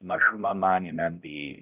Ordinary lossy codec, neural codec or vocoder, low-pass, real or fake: none; codec, 16 kHz, 0.5 kbps, X-Codec, HuBERT features, trained on balanced general audio; 3.6 kHz; fake